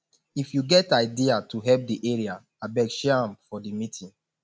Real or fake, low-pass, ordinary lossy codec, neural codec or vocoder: real; none; none; none